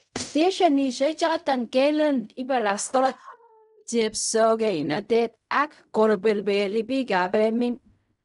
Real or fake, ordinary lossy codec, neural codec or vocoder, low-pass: fake; none; codec, 16 kHz in and 24 kHz out, 0.4 kbps, LongCat-Audio-Codec, fine tuned four codebook decoder; 10.8 kHz